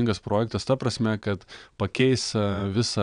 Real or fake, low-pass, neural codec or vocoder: fake; 9.9 kHz; vocoder, 22.05 kHz, 80 mel bands, WaveNeXt